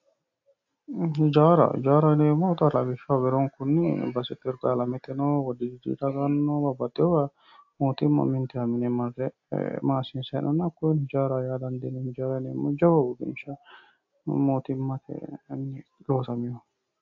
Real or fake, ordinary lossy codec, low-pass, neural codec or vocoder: real; AAC, 48 kbps; 7.2 kHz; none